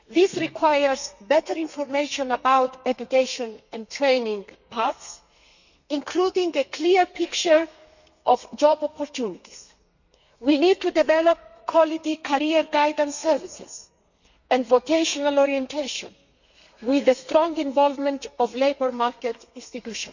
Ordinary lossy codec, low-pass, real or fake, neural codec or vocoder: none; 7.2 kHz; fake; codec, 32 kHz, 1.9 kbps, SNAC